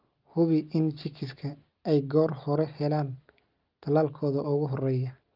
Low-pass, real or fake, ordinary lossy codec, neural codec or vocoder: 5.4 kHz; real; Opus, 24 kbps; none